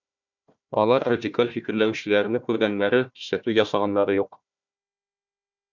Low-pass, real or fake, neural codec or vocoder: 7.2 kHz; fake; codec, 16 kHz, 1 kbps, FunCodec, trained on Chinese and English, 50 frames a second